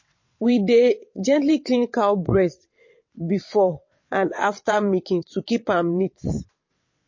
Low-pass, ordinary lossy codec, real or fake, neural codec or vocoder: 7.2 kHz; MP3, 32 kbps; fake; vocoder, 22.05 kHz, 80 mel bands, WaveNeXt